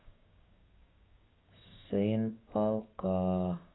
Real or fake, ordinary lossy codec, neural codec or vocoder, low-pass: fake; AAC, 16 kbps; codec, 16 kHz, 6 kbps, DAC; 7.2 kHz